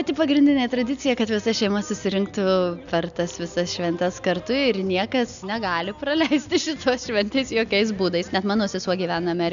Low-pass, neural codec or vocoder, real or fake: 7.2 kHz; none; real